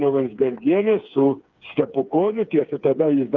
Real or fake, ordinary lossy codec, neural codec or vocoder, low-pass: fake; Opus, 24 kbps; codec, 16 kHz, 4 kbps, FreqCodec, smaller model; 7.2 kHz